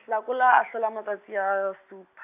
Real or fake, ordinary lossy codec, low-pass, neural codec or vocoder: real; AAC, 32 kbps; 3.6 kHz; none